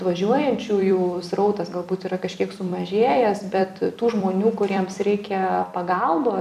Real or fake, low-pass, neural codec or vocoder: fake; 14.4 kHz; vocoder, 44.1 kHz, 128 mel bands every 256 samples, BigVGAN v2